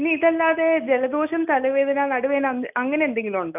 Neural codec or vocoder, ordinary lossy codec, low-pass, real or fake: none; none; 3.6 kHz; real